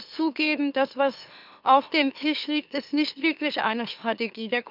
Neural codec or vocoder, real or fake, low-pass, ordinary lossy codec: autoencoder, 44.1 kHz, a latent of 192 numbers a frame, MeloTTS; fake; 5.4 kHz; none